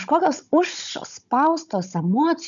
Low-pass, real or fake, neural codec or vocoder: 7.2 kHz; fake; codec, 16 kHz, 16 kbps, FunCodec, trained on Chinese and English, 50 frames a second